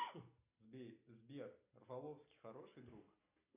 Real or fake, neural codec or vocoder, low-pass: real; none; 3.6 kHz